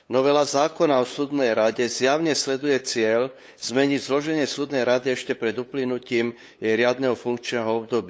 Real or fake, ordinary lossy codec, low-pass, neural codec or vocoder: fake; none; none; codec, 16 kHz, 8 kbps, FunCodec, trained on LibriTTS, 25 frames a second